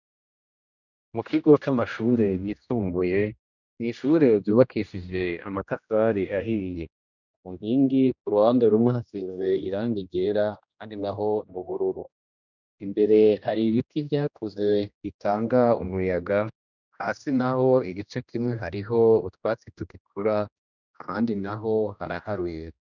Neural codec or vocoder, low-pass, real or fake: codec, 16 kHz, 1 kbps, X-Codec, HuBERT features, trained on general audio; 7.2 kHz; fake